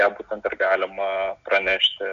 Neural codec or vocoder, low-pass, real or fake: none; 7.2 kHz; real